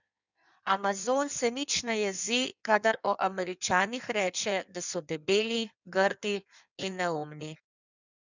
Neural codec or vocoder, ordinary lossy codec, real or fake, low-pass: codec, 16 kHz in and 24 kHz out, 1.1 kbps, FireRedTTS-2 codec; none; fake; 7.2 kHz